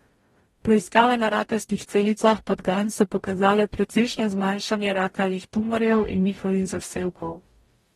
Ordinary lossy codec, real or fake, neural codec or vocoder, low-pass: AAC, 32 kbps; fake; codec, 44.1 kHz, 0.9 kbps, DAC; 19.8 kHz